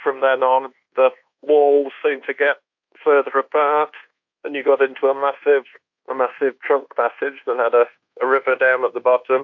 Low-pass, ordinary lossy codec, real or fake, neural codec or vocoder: 7.2 kHz; AAC, 48 kbps; fake; codec, 24 kHz, 1.2 kbps, DualCodec